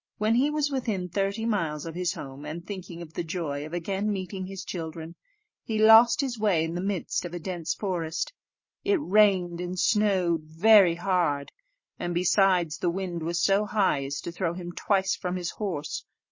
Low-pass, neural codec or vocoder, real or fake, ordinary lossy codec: 7.2 kHz; none; real; MP3, 32 kbps